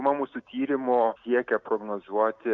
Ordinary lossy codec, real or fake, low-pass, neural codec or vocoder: Opus, 64 kbps; real; 7.2 kHz; none